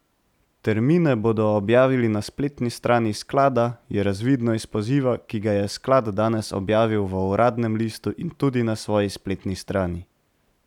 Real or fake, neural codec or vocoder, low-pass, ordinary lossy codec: real; none; 19.8 kHz; none